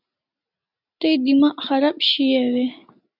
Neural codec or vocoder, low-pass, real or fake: none; 5.4 kHz; real